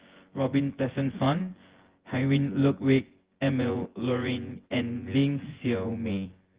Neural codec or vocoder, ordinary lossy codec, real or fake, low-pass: vocoder, 24 kHz, 100 mel bands, Vocos; Opus, 16 kbps; fake; 3.6 kHz